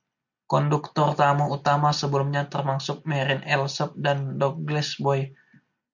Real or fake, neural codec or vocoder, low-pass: real; none; 7.2 kHz